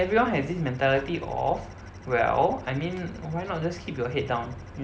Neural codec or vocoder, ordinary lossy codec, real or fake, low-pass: none; none; real; none